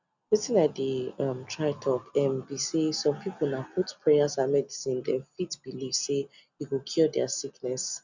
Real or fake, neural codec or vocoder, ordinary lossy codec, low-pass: real; none; none; 7.2 kHz